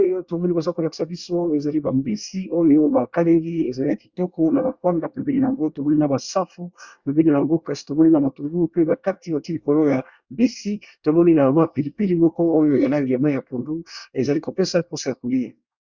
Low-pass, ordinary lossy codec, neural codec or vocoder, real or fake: 7.2 kHz; Opus, 64 kbps; codec, 24 kHz, 1 kbps, SNAC; fake